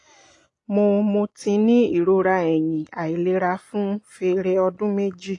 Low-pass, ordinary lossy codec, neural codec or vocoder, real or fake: 10.8 kHz; AAC, 48 kbps; none; real